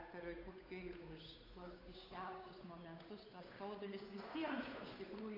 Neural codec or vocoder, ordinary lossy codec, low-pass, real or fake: codec, 16 kHz, 8 kbps, FunCodec, trained on Chinese and English, 25 frames a second; AAC, 24 kbps; 5.4 kHz; fake